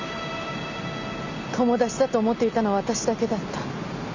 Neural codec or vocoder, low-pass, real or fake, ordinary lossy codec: none; 7.2 kHz; real; none